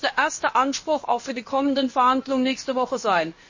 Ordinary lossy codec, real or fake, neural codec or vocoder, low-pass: MP3, 32 kbps; fake; codec, 16 kHz, about 1 kbps, DyCAST, with the encoder's durations; 7.2 kHz